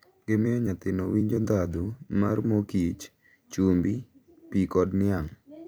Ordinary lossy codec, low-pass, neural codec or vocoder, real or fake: none; none; vocoder, 44.1 kHz, 128 mel bands every 256 samples, BigVGAN v2; fake